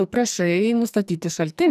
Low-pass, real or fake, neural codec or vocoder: 14.4 kHz; fake; codec, 44.1 kHz, 2.6 kbps, SNAC